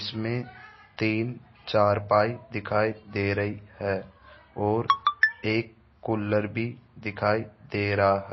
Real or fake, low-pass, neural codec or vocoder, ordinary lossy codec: real; 7.2 kHz; none; MP3, 24 kbps